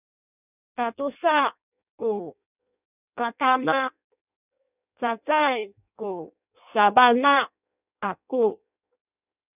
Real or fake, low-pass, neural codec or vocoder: fake; 3.6 kHz; codec, 16 kHz in and 24 kHz out, 0.6 kbps, FireRedTTS-2 codec